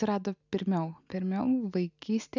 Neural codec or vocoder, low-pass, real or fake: none; 7.2 kHz; real